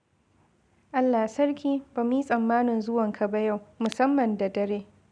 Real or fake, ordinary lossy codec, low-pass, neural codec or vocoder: real; none; 9.9 kHz; none